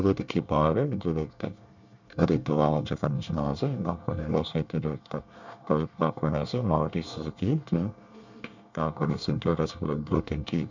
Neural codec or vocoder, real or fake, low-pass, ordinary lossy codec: codec, 24 kHz, 1 kbps, SNAC; fake; 7.2 kHz; none